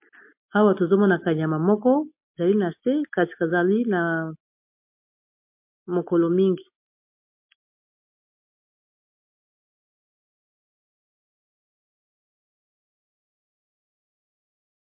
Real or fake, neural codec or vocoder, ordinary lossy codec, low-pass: real; none; MP3, 32 kbps; 3.6 kHz